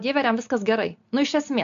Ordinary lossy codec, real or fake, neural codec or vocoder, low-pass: MP3, 64 kbps; real; none; 7.2 kHz